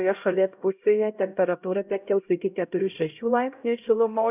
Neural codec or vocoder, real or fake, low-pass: codec, 16 kHz, 0.5 kbps, X-Codec, HuBERT features, trained on LibriSpeech; fake; 3.6 kHz